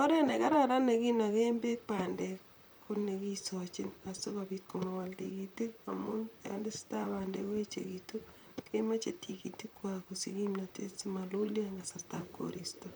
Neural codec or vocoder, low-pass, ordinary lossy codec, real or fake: vocoder, 44.1 kHz, 128 mel bands, Pupu-Vocoder; none; none; fake